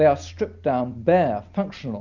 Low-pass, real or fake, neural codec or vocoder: 7.2 kHz; real; none